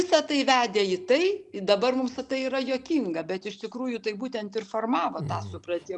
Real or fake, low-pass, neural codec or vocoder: real; 10.8 kHz; none